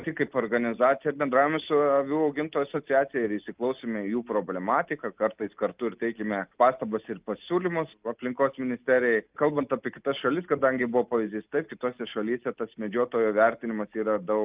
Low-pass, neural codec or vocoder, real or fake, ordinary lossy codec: 3.6 kHz; none; real; Opus, 24 kbps